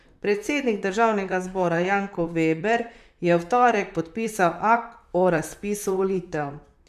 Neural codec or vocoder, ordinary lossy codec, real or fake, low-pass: vocoder, 44.1 kHz, 128 mel bands, Pupu-Vocoder; none; fake; 14.4 kHz